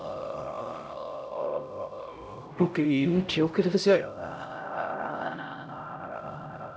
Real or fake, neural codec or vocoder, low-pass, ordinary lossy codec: fake; codec, 16 kHz, 0.5 kbps, X-Codec, HuBERT features, trained on LibriSpeech; none; none